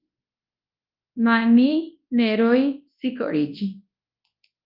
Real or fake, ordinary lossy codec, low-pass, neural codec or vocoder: fake; Opus, 24 kbps; 5.4 kHz; codec, 24 kHz, 0.9 kbps, WavTokenizer, large speech release